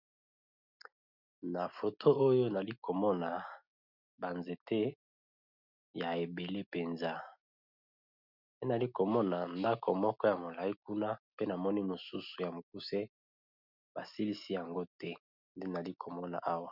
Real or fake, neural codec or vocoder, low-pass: real; none; 5.4 kHz